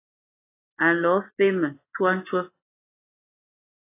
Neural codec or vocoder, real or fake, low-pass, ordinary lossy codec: none; real; 3.6 kHz; AAC, 24 kbps